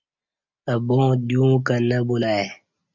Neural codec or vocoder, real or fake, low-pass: none; real; 7.2 kHz